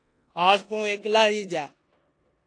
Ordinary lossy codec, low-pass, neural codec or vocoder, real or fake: AAC, 48 kbps; 9.9 kHz; codec, 16 kHz in and 24 kHz out, 0.9 kbps, LongCat-Audio-Codec, four codebook decoder; fake